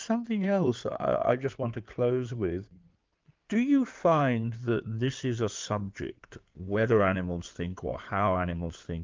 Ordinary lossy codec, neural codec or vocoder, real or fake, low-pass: Opus, 24 kbps; codec, 16 kHz in and 24 kHz out, 2.2 kbps, FireRedTTS-2 codec; fake; 7.2 kHz